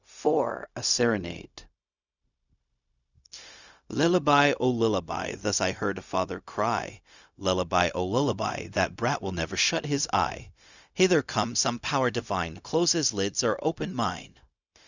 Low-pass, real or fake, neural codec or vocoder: 7.2 kHz; fake; codec, 16 kHz, 0.4 kbps, LongCat-Audio-Codec